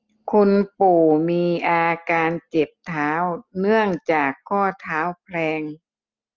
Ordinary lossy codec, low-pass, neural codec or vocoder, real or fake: Opus, 24 kbps; 7.2 kHz; none; real